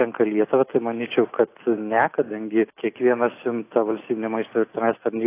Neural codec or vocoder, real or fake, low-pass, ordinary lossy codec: none; real; 3.6 kHz; AAC, 24 kbps